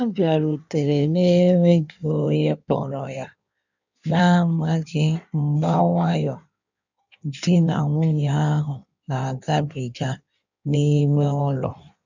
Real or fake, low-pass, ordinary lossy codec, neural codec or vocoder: fake; 7.2 kHz; none; codec, 16 kHz in and 24 kHz out, 1.1 kbps, FireRedTTS-2 codec